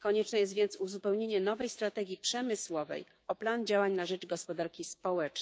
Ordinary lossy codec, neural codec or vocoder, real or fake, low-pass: none; codec, 16 kHz, 6 kbps, DAC; fake; none